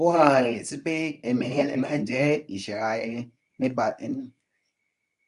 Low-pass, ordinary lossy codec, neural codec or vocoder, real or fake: 10.8 kHz; none; codec, 24 kHz, 0.9 kbps, WavTokenizer, medium speech release version 2; fake